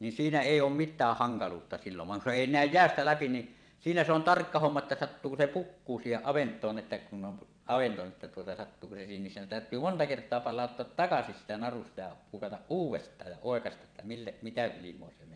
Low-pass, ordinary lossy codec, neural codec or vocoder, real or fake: 9.9 kHz; none; vocoder, 22.05 kHz, 80 mel bands, WaveNeXt; fake